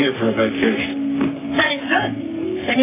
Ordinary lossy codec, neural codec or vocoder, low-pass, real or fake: AAC, 16 kbps; codec, 44.1 kHz, 3.4 kbps, Pupu-Codec; 3.6 kHz; fake